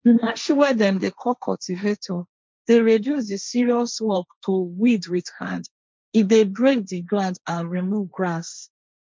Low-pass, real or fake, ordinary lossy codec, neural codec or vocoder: none; fake; none; codec, 16 kHz, 1.1 kbps, Voila-Tokenizer